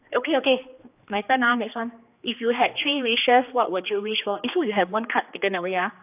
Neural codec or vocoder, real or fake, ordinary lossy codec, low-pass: codec, 16 kHz, 2 kbps, X-Codec, HuBERT features, trained on general audio; fake; AAC, 32 kbps; 3.6 kHz